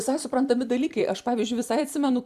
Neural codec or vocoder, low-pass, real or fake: vocoder, 44.1 kHz, 128 mel bands every 256 samples, BigVGAN v2; 14.4 kHz; fake